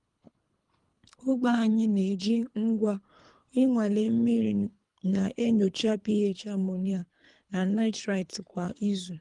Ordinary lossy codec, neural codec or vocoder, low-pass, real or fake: Opus, 32 kbps; codec, 24 kHz, 3 kbps, HILCodec; 10.8 kHz; fake